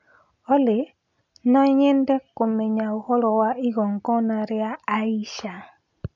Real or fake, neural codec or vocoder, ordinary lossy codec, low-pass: real; none; none; 7.2 kHz